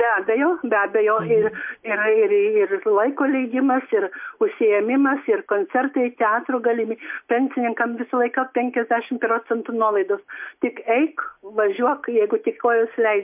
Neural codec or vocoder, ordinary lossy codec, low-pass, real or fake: none; MP3, 32 kbps; 3.6 kHz; real